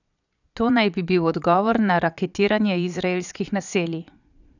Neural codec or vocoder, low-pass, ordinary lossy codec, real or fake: vocoder, 22.05 kHz, 80 mel bands, Vocos; 7.2 kHz; none; fake